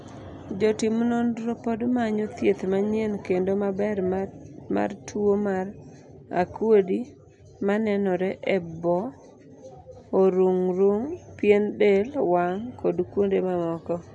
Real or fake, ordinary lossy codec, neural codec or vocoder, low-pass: real; none; none; 10.8 kHz